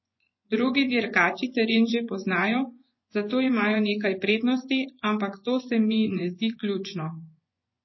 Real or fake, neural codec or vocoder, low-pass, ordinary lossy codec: fake; vocoder, 24 kHz, 100 mel bands, Vocos; 7.2 kHz; MP3, 24 kbps